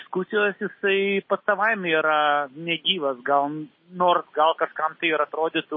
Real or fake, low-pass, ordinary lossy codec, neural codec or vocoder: real; 7.2 kHz; MP3, 24 kbps; none